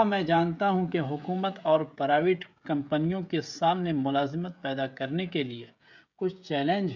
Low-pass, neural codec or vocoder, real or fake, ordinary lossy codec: 7.2 kHz; codec, 16 kHz, 16 kbps, FreqCodec, smaller model; fake; MP3, 64 kbps